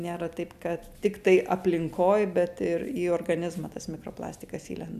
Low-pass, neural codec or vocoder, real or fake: 14.4 kHz; none; real